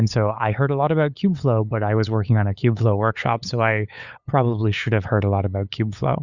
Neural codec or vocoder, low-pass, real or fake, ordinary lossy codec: codec, 16 kHz, 8 kbps, FunCodec, trained on LibriTTS, 25 frames a second; 7.2 kHz; fake; Opus, 64 kbps